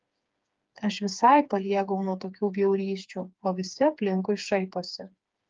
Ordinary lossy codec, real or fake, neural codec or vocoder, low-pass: Opus, 32 kbps; fake; codec, 16 kHz, 4 kbps, FreqCodec, smaller model; 7.2 kHz